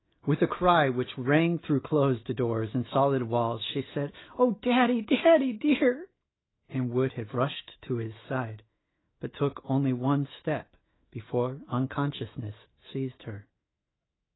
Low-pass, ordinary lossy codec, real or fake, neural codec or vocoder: 7.2 kHz; AAC, 16 kbps; fake; autoencoder, 48 kHz, 128 numbers a frame, DAC-VAE, trained on Japanese speech